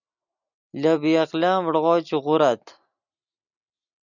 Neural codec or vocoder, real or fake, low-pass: none; real; 7.2 kHz